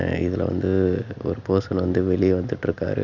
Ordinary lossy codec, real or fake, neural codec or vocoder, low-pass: none; real; none; 7.2 kHz